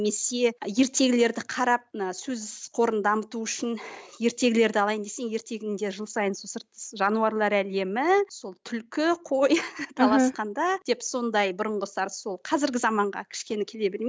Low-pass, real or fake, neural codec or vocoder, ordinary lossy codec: none; real; none; none